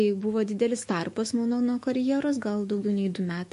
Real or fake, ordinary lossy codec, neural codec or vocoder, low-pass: fake; MP3, 48 kbps; autoencoder, 48 kHz, 128 numbers a frame, DAC-VAE, trained on Japanese speech; 14.4 kHz